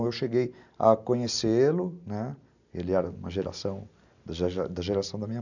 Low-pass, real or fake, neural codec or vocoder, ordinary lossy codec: 7.2 kHz; real; none; none